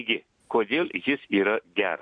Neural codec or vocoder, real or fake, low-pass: none; real; 9.9 kHz